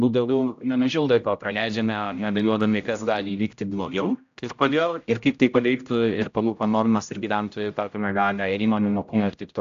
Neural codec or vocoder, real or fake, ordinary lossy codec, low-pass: codec, 16 kHz, 0.5 kbps, X-Codec, HuBERT features, trained on general audio; fake; AAC, 64 kbps; 7.2 kHz